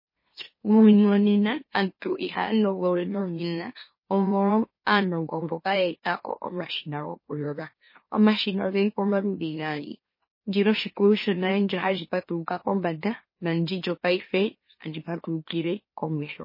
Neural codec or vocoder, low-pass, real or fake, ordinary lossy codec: autoencoder, 44.1 kHz, a latent of 192 numbers a frame, MeloTTS; 5.4 kHz; fake; MP3, 24 kbps